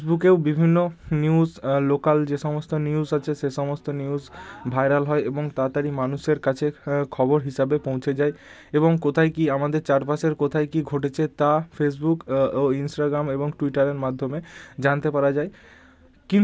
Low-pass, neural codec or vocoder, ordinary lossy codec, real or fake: none; none; none; real